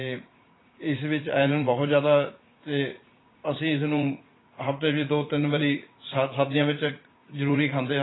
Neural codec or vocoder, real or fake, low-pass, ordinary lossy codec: vocoder, 44.1 kHz, 80 mel bands, Vocos; fake; 7.2 kHz; AAC, 16 kbps